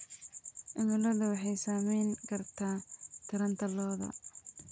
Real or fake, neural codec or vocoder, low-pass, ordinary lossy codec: real; none; none; none